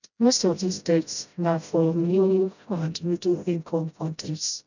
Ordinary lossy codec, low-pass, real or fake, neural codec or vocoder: none; 7.2 kHz; fake; codec, 16 kHz, 0.5 kbps, FreqCodec, smaller model